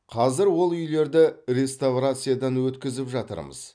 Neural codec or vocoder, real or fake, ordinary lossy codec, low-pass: none; real; none; 9.9 kHz